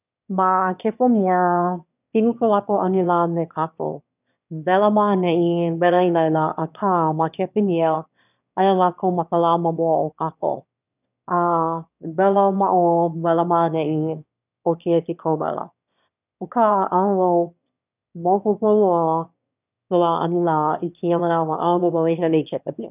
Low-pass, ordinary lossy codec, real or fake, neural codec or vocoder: 3.6 kHz; none; fake; autoencoder, 22.05 kHz, a latent of 192 numbers a frame, VITS, trained on one speaker